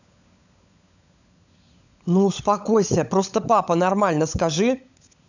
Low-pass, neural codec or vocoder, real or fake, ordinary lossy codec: 7.2 kHz; codec, 16 kHz, 16 kbps, FunCodec, trained on LibriTTS, 50 frames a second; fake; none